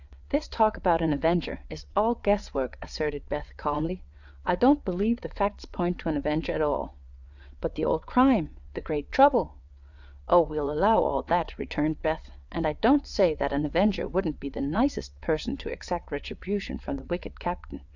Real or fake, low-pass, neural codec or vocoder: fake; 7.2 kHz; vocoder, 22.05 kHz, 80 mel bands, WaveNeXt